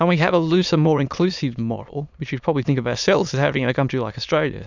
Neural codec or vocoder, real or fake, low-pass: autoencoder, 22.05 kHz, a latent of 192 numbers a frame, VITS, trained on many speakers; fake; 7.2 kHz